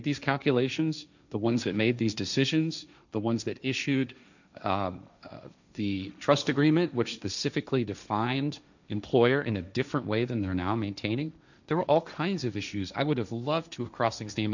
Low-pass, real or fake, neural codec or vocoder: 7.2 kHz; fake; codec, 16 kHz, 1.1 kbps, Voila-Tokenizer